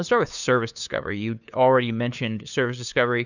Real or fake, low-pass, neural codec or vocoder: fake; 7.2 kHz; codec, 16 kHz, 2 kbps, FunCodec, trained on LibriTTS, 25 frames a second